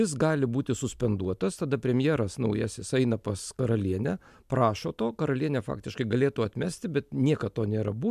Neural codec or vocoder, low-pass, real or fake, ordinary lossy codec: none; 14.4 kHz; real; MP3, 96 kbps